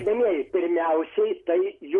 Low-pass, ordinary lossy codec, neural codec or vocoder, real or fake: 10.8 kHz; MP3, 48 kbps; none; real